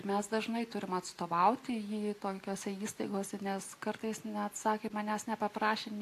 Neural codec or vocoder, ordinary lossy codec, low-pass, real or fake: none; AAC, 64 kbps; 14.4 kHz; real